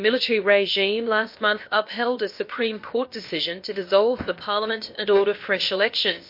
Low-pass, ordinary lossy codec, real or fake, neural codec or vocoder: 5.4 kHz; MP3, 32 kbps; fake; codec, 16 kHz, about 1 kbps, DyCAST, with the encoder's durations